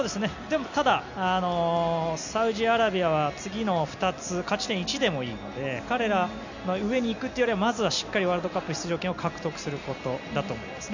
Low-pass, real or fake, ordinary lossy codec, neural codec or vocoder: 7.2 kHz; real; none; none